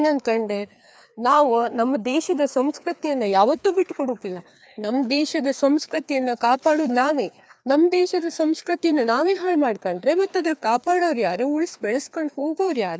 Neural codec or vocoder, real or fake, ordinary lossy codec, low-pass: codec, 16 kHz, 2 kbps, FreqCodec, larger model; fake; none; none